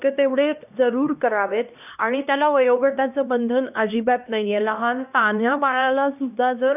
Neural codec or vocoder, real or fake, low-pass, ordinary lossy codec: codec, 16 kHz, 1 kbps, X-Codec, HuBERT features, trained on LibriSpeech; fake; 3.6 kHz; none